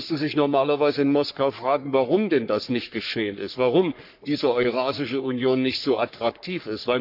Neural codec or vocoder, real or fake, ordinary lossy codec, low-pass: codec, 44.1 kHz, 3.4 kbps, Pupu-Codec; fake; none; 5.4 kHz